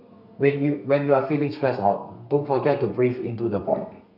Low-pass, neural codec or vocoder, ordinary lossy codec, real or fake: 5.4 kHz; codec, 32 kHz, 1.9 kbps, SNAC; none; fake